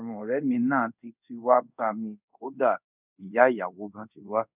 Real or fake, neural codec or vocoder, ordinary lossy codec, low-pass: fake; codec, 24 kHz, 0.5 kbps, DualCodec; none; 3.6 kHz